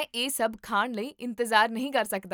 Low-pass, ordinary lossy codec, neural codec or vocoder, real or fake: none; none; none; real